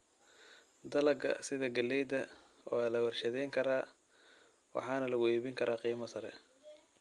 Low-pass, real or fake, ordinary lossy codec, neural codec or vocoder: 10.8 kHz; real; Opus, 32 kbps; none